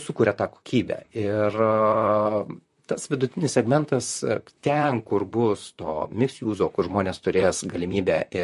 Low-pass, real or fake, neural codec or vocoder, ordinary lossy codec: 14.4 kHz; fake; vocoder, 44.1 kHz, 128 mel bands, Pupu-Vocoder; MP3, 48 kbps